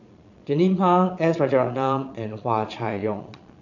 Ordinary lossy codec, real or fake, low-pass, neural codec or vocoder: none; fake; 7.2 kHz; vocoder, 22.05 kHz, 80 mel bands, Vocos